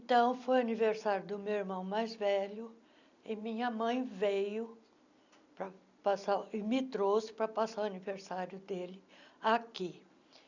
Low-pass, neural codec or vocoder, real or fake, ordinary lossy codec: 7.2 kHz; none; real; none